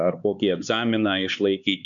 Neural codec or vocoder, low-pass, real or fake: codec, 16 kHz, 4 kbps, X-Codec, HuBERT features, trained on LibriSpeech; 7.2 kHz; fake